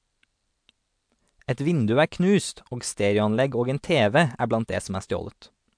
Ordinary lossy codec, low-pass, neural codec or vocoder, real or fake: MP3, 64 kbps; 9.9 kHz; none; real